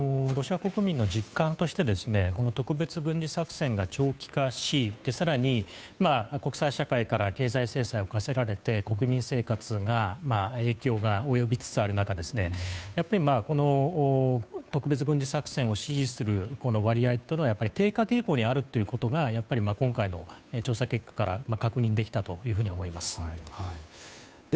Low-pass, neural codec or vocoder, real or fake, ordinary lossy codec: none; codec, 16 kHz, 2 kbps, FunCodec, trained on Chinese and English, 25 frames a second; fake; none